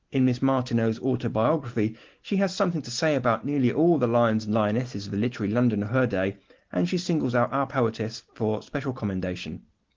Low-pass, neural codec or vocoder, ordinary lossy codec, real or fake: 7.2 kHz; none; Opus, 16 kbps; real